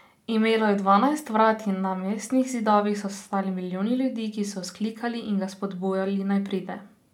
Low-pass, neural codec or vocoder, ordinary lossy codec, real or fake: 19.8 kHz; none; none; real